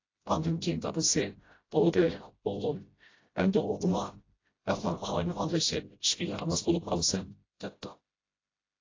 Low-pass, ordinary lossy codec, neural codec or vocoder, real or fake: 7.2 kHz; AAC, 32 kbps; codec, 16 kHz, 0.5 kbps, FreqCodec, smaller model; fake